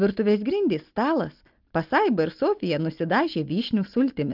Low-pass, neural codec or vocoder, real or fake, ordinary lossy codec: 5.4 kHz; none; real; Opus, 32 kbps